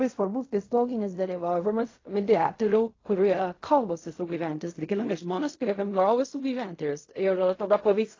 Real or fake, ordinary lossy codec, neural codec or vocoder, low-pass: fake; AAC, 32 kbps; codec, 16 kHz in and 24 kHz out, 0.4 kbps, LongCat-Audio-Codec, fine tuned four codebook decoder; 7.2 kHz